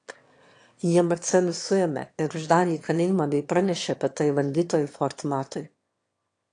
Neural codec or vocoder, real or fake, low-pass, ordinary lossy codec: autoencoder, 22.05 kHz, a latent of 192 numbers a frame, VITS, trained on one speaker; fake; 9.9 kHz; AAC, 48 kbps